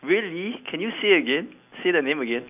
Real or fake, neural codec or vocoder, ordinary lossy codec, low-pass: real; none; none; 3.6 kHz